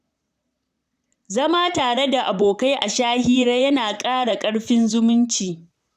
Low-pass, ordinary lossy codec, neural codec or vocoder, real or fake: 14.4 kHz; none; vocoder, 44.1 kHz, 128 mel bands, Pupu-Vocoder; fake